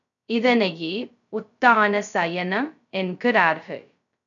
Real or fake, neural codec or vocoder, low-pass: fake; codec, 16 kHz, 0.2 kbps, FocalCodec; 7.2 kHz